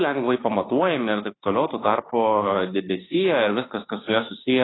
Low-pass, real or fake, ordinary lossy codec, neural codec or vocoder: 7.2 kHz; fake; AAC, 16 kbps; autoencoder, 48 kHz, 32 numbers a frame, DAC-VAE, trained on Japanese speech